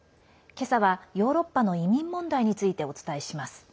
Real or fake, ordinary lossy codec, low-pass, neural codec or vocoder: real; none; none; none